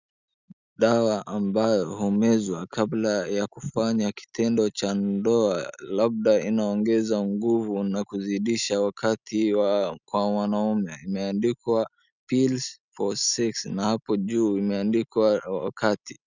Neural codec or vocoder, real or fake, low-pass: none; real; 7.2 kHz